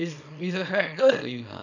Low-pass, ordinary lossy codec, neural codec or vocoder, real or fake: 7.2 kHz; none; codec, 24 kHz, 0.9 kbps, WavTokenizer, small release; fake